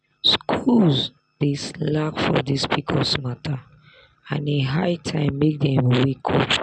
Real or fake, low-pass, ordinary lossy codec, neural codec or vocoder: real; 9.9 kHz; none; none